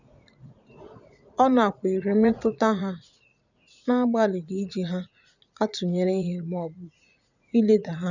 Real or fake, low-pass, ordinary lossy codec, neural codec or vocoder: fake; 7.2 kHz; none; vocoder, 44.1 kHz, 80 mel bands, Vocos